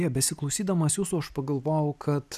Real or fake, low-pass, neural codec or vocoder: real; 14.4 kHz; none